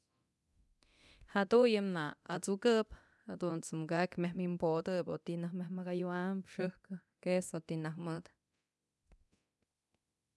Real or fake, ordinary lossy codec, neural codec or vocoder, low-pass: fake; none; codec, 24 kHz, 0.9 kbps, DualCodec; none